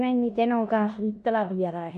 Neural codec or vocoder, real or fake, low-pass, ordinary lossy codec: codec, 16 kHz in and 24 kHz out, 0.9 kbps, LongCat-Audio-Codec, four codebook decoder; fake; 10.8 kHz; MP3, 64 kbps